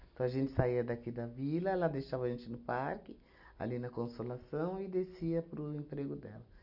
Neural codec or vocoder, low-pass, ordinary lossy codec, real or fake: none; 5.4 kHz; none; real